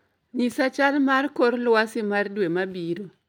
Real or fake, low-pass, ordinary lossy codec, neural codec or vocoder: real; 19.8 kHz; none; none